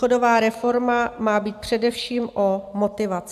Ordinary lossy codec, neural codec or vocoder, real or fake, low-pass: AAC, 96 kbps; none; real; 14.4 kHz